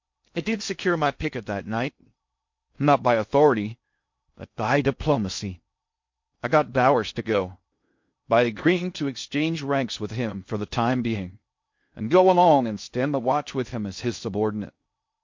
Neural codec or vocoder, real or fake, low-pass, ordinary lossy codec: codec, 16 kHz in and 24 kHz out, 0.6 kbps, FocalCodec, streaming, 2048 codes; fake; 7.2 kHz; MP3, 48 kbps